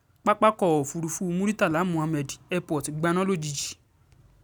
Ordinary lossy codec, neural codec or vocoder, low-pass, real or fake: none; none; none; real